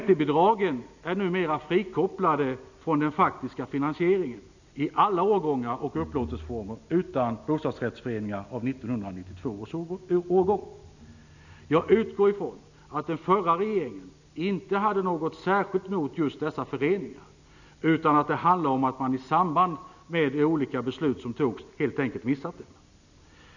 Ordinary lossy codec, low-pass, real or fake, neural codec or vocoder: none; 7.2 kHz; real; none